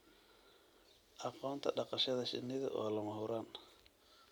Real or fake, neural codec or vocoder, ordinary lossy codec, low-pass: real; none; none; none